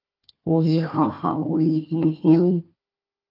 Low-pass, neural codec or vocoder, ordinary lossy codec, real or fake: 5.4 kHz; codec, 16 kHz, 1 kbps, FunCodec, trained on Chinese and English, 50 frames a second; Opus, 24 kbps; fake